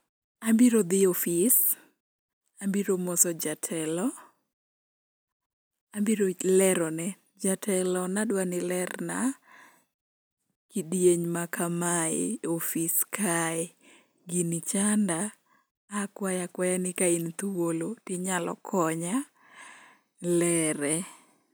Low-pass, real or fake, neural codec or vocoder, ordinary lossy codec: none; real; none; none